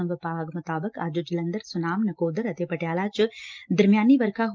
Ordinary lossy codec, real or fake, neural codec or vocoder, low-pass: Opus, 24 kbps; real; none; 7.2 kHz